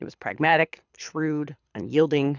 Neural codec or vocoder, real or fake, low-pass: codec, 24 kHz, 6 kbps, HILCodec; fake; 7.2 kHz